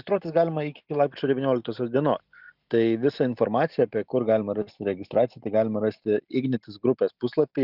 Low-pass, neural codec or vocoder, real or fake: 5.4 kHz; none; real